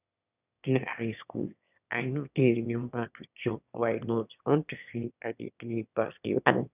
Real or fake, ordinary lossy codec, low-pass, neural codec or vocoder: fake; none; 3.6 kHz; autoencoder, 22.05 kHz, a latent of 192 numbers a frame, VITS, trained on one speaker